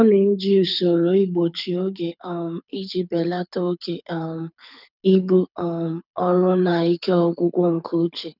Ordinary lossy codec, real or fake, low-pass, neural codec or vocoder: none; fake; 5.4 kHz; codec, 24 kHz, 6 kbps, HILCodec